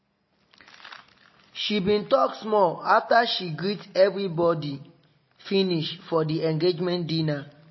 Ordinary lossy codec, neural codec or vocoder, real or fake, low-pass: MP3, 24 kbps; none; real; 7.2 kHz